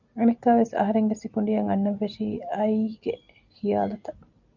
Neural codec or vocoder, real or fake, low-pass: none; real; 7.2 kHz